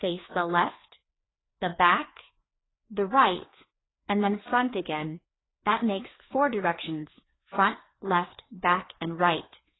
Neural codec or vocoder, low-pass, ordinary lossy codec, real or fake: codec, 16 kHz, 2 kbps, FreqCodec, larger model; 7.2 kHz; AAC, 16 kbps; fake